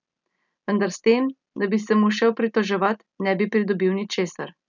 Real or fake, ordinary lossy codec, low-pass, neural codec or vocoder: real; none; 7.2 kHz; none